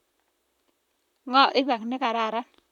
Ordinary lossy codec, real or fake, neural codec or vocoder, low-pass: none; fake; vocoder, 44.1 kHz, 128 mel bands, Pupu-Vocoder; 19.8 kHz